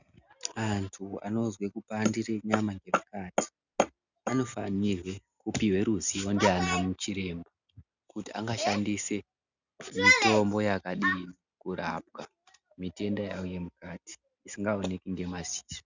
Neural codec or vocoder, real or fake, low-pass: none; real; 7.2 kHz